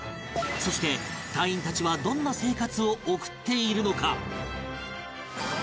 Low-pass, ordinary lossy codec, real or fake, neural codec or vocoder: none; none; real; none